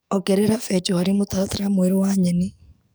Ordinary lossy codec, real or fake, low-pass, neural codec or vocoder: none; fake; none; codec, 44.1 kHz, 7.8 kbps, DAC